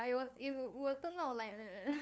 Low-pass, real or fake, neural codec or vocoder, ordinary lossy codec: none; fake; codec, 16 kHz, 2 kbps, FunCodec, trained on LibriTTS, 25 frames a second; none